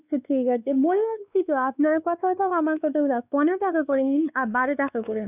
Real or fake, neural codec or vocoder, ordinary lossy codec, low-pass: fake; codec, 16 kHz, 2 kbps, X-Codec, WavLM features, trained on Multilingual LibriSpeech; none; 3.6 kHz